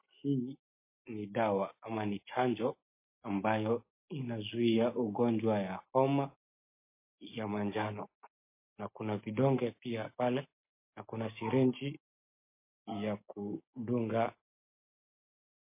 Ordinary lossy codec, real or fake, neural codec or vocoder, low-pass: MP3, 24 kbps; real; none; 3.6 kHz